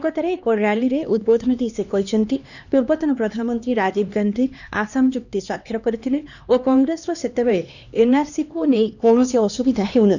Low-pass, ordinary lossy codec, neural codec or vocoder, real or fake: 7.2 kHz; none; codec, 16 kHz, 2 kbps, X-Codec, HuBERT features, trained on LibriSpeech; fake